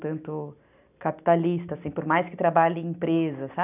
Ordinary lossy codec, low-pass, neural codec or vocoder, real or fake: none; 3.6 kHz; none; real